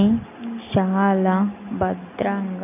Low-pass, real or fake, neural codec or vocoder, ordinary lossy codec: 3.6 kHz; real; none; none